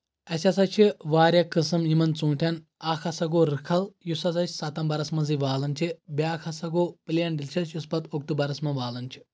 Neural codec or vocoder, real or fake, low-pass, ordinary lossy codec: none; real; none; none